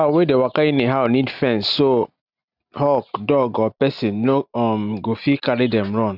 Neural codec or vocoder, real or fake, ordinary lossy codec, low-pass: none; real; none; 5.4 kHz